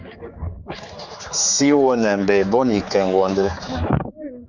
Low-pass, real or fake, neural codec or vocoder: 7.2 kHz; fake; codec, 16 kHz, 4 kbps, X-Codec, HuBERT features, trained on general audio